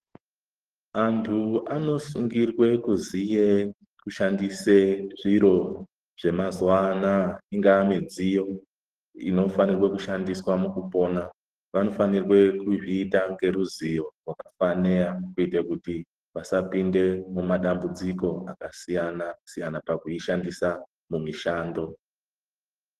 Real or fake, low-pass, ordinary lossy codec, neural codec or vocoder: fake; 14.4 kHz; Opus, 16 kbps; codec, 44.1 kHz, 7.8 kbps, DAC